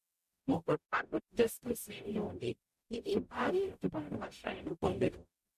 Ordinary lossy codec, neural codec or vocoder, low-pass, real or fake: MP3, 64 kbps; codec, 44.1 kHz, 0.9 kbps, DAC; 14.4 kHz; fake